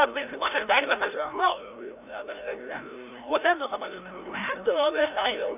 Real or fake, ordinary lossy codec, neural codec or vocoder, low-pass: fake; none; codec, 16 kHz, 0.5 kbps, FreqCodec, larger model; 3.6 kHz